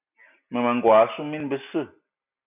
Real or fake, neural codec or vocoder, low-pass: real; none; 3.6 kHz